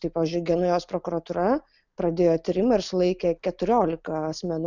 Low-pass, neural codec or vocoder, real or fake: 7.2 kHz; none; real